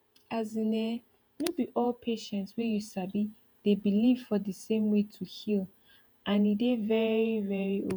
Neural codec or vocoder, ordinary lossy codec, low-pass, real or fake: vocoder, 48 kHz, 128 mel bands, Vocos; none; 19.8 kHz; fake